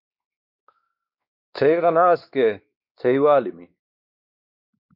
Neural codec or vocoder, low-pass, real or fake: codec, 16 kHz, 2 kbps, X-Codec, WavLM features, trained on Multilingual LibriSpeech; 5.4 kHz; fake